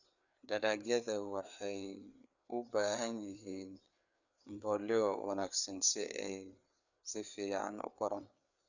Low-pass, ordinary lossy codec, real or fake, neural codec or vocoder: 7.2 kHz; none; fake; codec, 16 kHz, 4 kbps, FreqCodec, larger model